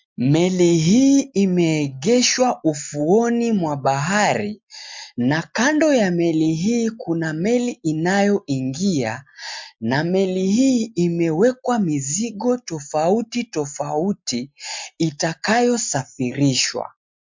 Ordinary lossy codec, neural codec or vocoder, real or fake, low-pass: MP3, 64 kbps; none; real; 7.2 kHz